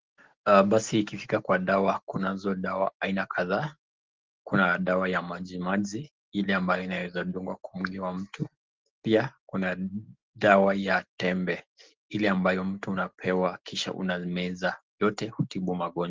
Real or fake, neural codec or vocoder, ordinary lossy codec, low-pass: real; none; Opus, 16 kbps; 7.2 kHz